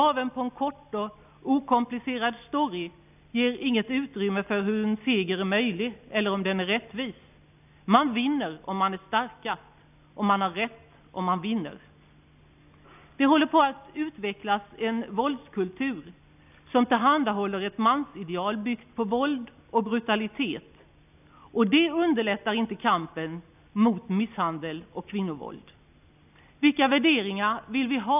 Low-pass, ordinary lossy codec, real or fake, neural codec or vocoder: 3.6 kHz; none; real; none